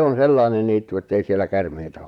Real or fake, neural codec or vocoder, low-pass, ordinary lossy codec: fake; vocoder, 44.1 kHz, 128 mel bands, Pupu-Vocoder; 19.8 kHz; none